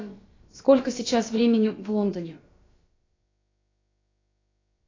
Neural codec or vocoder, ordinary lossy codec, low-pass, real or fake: codec, 16 kHz, about 1 kbps, DyCAST, with the encoder's durations; AAC, 32 kbps; 7.2 kHz; fake